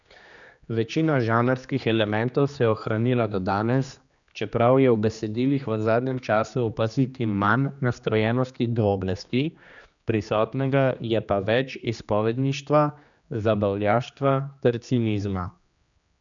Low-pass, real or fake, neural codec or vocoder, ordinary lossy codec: 7.2 kHz; fake; codec, 16 kHz, 2 kbps, X-Codec, HuBERT features, trained on general audio; none